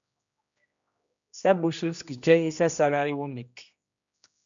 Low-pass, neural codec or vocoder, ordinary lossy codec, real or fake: 7.2 kHz; codec, 16 kHz, 1 kbps, X-Codec, HuBERT features, trained on general audio; MP3, 64 kbps; fake